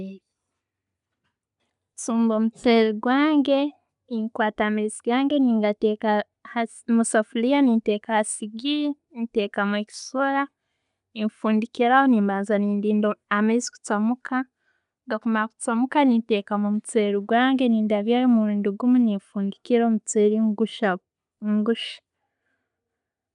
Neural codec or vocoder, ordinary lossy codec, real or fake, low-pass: none; none; real; 10.8 kHz